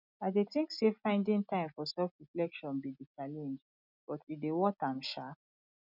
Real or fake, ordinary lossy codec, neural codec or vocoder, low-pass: real; none; none; 5.4 kHz